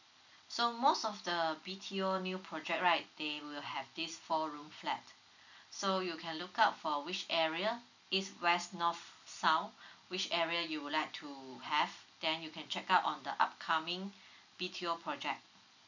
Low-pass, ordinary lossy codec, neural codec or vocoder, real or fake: 7.2 kHz; none; none; real